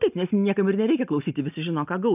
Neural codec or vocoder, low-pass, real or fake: none; 3.6 kHz; real